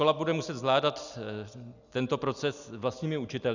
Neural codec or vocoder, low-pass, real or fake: none; 7.2 kHz; real